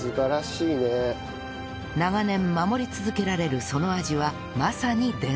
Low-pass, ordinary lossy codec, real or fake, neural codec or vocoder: none; none; real; none